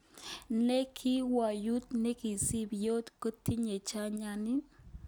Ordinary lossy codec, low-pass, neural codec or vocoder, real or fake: none; none; none; real